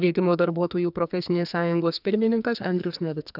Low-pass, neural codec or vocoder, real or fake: 5.4 kHz; codec, 44.1 kHz, 2.6 kbps, SNAC; fake